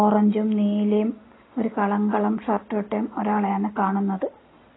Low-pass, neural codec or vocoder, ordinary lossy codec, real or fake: 7.2 kHz; none; AAC, 16 kbps; real